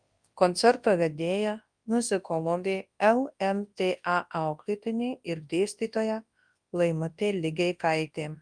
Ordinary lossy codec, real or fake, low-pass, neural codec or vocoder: Opus, 32 kbps; fake; 9.9 kHz; codec, 24 kHz, 0.9 kbps, WavTokenizer, large speech release